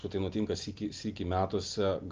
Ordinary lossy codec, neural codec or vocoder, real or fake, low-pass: Opus, 32 kbps; none; real; 7.2 kHz